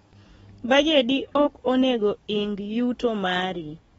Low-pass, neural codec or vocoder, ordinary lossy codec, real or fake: 19.8 kHz; codec, 44.1 kHz, 7.8 kbps, DAC; AAC, 24 kbps; fake